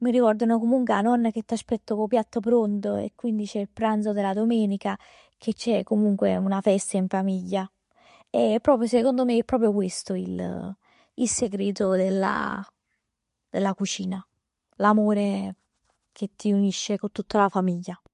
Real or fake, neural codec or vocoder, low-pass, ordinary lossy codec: real; none; 10.8 kHz; MP3, 48 kbps